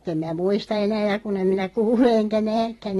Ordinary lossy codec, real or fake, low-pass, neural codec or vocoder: AAC, 32 kbps; fake; 19.8 kHz; vocoder, 44.1 kHz, 128 mel bands every 512 samples, BigVGAN v2